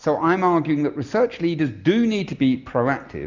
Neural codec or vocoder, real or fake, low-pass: none; real; 7.2 kHz